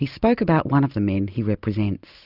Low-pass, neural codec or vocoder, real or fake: 5.4 kHz; none; real